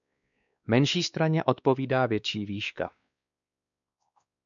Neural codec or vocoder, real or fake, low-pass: codec, 16 kHz, 2 kbps, X-Codec, WavLM features, trained on Multilingual LibriSpeech; fake; 7.2 kHz